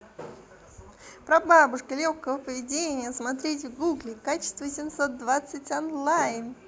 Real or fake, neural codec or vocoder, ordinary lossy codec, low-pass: real; none; none; none